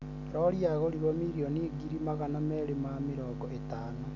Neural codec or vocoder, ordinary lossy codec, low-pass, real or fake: none; none; 7.2 kHz; real